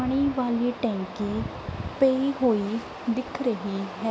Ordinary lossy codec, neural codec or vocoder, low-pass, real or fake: none; none; none; real